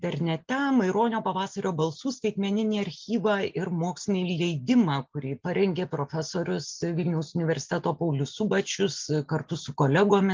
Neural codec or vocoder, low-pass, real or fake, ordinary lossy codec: none; 7.2 kHz; real; Opus, 24 kbps